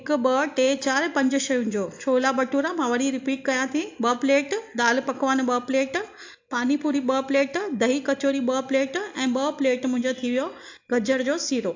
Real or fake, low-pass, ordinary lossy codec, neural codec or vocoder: real; 7.2 kHz; AAC, 48 kbps; none